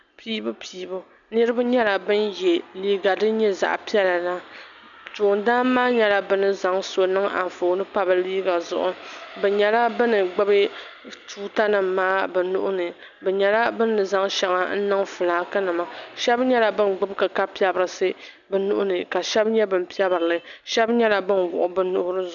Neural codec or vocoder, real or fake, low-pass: none; real; 7.2 kHz